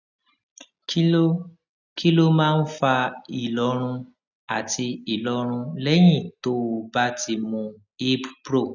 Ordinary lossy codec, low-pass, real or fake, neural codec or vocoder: none; 7.2 kHz; real; none